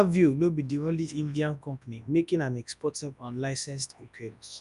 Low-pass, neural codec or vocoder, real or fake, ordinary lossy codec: 10.8 kHz; codec, 24 kHz, 0.9 kbps, WavTokenizer, large speech release; fake; none